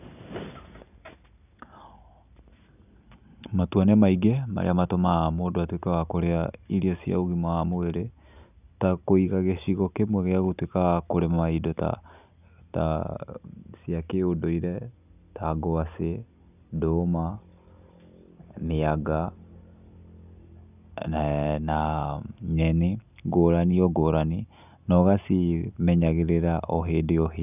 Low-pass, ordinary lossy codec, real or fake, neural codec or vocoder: 3.6 kHz; none; real; none